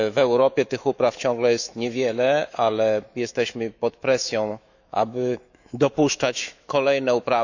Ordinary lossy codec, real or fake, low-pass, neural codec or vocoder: none; fake; 7.2 kHz; autoencoder, 48 kHz, 128 numbers a frame, DAC-VAE, trained on Japanese speech